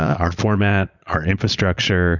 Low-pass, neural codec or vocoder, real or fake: 7.2 kHz; vocoder, 22.05 kHz, 80 mel bands, Vocos; fake